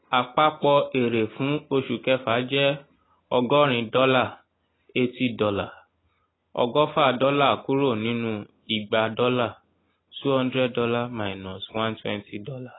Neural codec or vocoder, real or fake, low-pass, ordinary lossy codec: none; real; 7.2 kHz; AAC, 16 kbps